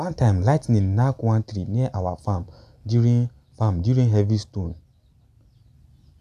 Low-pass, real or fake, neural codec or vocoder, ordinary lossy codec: 14.4 kHz; real; none; none